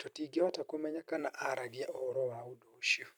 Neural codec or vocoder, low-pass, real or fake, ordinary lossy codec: vocoder, 44.1 kHz, 128 mel bands every 256 samples, BigVGAN v2; none; fake; none